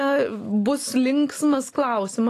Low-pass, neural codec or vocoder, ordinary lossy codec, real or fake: 14.4 kHz; none; AAC, 48 kbps; real